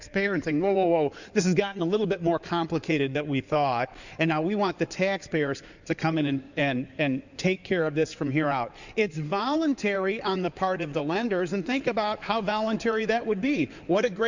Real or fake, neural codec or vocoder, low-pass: fake; codec, 16 kHz in and 24 kHz out, 2.2 kbps, FireRedTTS-2 codec; 7.2 kHz